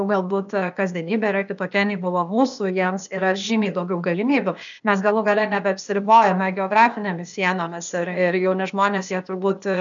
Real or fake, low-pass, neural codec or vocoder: fake; 7.2 kHz; codec, 16 kHz, 0.8 kbps, ZipCodec